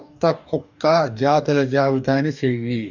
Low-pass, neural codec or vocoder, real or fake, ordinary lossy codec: 7.2 kHz; codec, 44.1 kHz, 2.6 kbps, DAC; fake; none